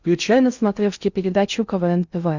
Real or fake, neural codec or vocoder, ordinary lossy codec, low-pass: fake; codec, 16 kHz in and 24 kHz out, 0.6 kbps, FocalCodec, streaming, 2048 codes; Opus, 64 kbps; 7.2 kHz